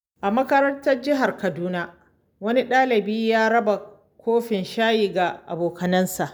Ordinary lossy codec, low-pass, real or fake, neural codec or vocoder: none; none; real; none